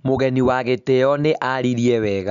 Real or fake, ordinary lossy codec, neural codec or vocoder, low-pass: real; none; none; 7.2 kHz